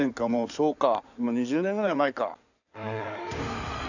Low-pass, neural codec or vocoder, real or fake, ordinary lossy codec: 7.2 kHz; codec, 16 kHz in and 24 kHz out, 2.2 kbps, FireRedTTS-2 codec; fake; none